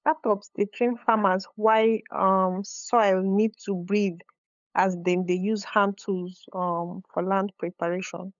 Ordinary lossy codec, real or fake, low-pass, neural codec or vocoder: none; fake; 7.2 kHz; codec, 16 kHz, 8 kbps, FunCodec, trained on LibriTTS, 25 frames a second